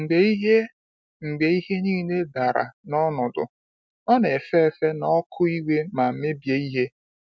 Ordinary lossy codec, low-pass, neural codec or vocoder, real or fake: none; none; none; real